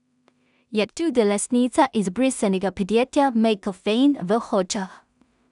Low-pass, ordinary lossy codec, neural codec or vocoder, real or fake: 10.8 kHz; none; codec, 16 kHz in and 24 kHz out, 0.4 kbps, LongCat-Audio-Codec, two codebook decoder; fake